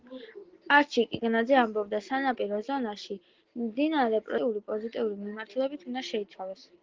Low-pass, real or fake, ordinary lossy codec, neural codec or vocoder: 7.2 kHz; real; Opus, 16 kbps; none